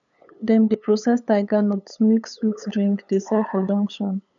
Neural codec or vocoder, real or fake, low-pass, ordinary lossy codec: codec, 16 kHz, 8 kbps, FunCodec, trained on LibriTTS, 25 frames a second; fake; 7.2 kHz; none